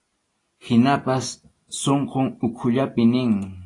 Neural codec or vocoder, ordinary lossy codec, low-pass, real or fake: none; AAC, 32 kbps; 10.8 kHz; real